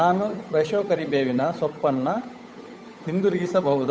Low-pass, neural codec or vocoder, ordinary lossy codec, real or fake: none; codec, 16 kHz, 8 kbps, FunCodec, trained on Chinese and English, 25 frames a second; none; fake